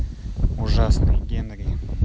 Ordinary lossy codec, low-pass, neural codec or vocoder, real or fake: none; none; none; real